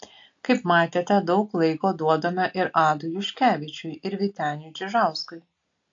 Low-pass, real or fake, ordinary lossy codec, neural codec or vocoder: 7.2 kHz; real; AAC, 48 kbps; none